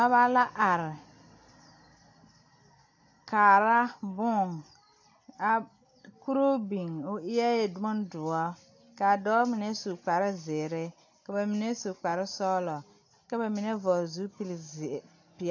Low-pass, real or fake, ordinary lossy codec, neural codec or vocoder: 7.2 kHz; real; AAC, 48 kbps; none